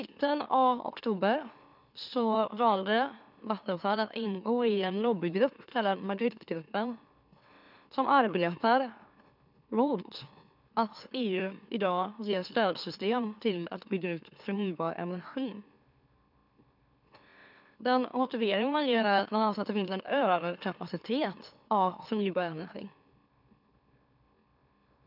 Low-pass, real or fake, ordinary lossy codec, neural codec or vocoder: 5.4 kHz; fake; none; autoencoder, 44.1 kHz, a latent of 192 numbers a frame, MeloTTS